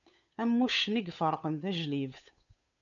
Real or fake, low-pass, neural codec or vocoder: fake; 7.2 kHz; codec, 16 kHz, 8 kbps, FunCodec, trained on Chinese and English, 25 frames a second